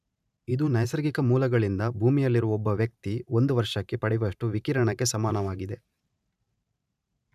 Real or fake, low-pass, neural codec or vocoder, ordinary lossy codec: fake; 14.4 kHz; vocoder, 44.1 kHz, 128 mel bands every 512 samples, BigVGAN v2; none